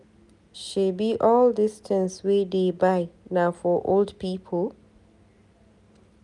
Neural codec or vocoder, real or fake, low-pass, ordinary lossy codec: none; real; 10.8 kHz; none